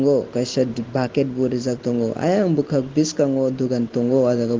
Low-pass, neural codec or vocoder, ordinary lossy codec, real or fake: 7.2 kHz; none; Opus, 16 kbps; real